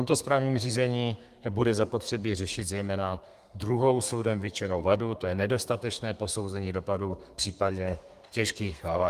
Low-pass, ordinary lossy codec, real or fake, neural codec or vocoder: 14.4 kHz; Opus, 32 kbps; fake; codec, 32 kHz, 1.9 kbps, SNAC